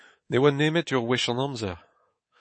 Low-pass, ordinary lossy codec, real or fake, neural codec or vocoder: 9.9 kHz; MP3, 32 kbps; fake; codec, 24 kHz, 3.1 kbps, DualCodec